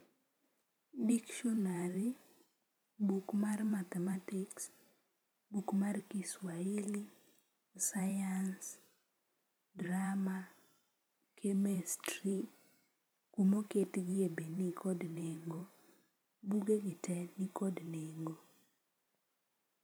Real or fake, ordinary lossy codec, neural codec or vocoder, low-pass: fake; none; vocoder, 44.1 kHz, 128 mel bands every 512 samples, BigVGAN v2; none